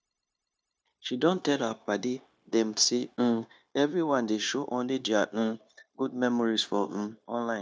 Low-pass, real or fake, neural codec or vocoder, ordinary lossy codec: none; fake; codec, 16 kHz, 0.9 kbps, LongCat-Audio-Codec; none